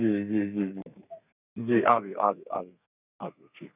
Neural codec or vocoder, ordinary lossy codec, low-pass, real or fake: codec, 32 kHz, 1.9 kbps, SNAC; none; 3.6 kHz; fake